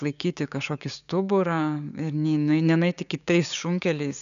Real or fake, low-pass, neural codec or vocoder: fake; 7.2 kHz; codec, 16 kHz, 6 kbps, DAC